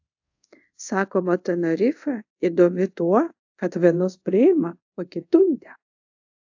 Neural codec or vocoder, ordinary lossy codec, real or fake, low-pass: codec, 24 kHz, 0.5 kbps, DualCodec; AAC, 48 kbps; fake; 7.2 kHz